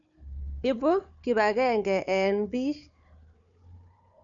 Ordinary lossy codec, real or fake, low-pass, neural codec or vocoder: none; fake; 7.2 kHz; codec, 16 kHz, 4 kbps, FunCodec, trained on Chinese and English, 50 frames a second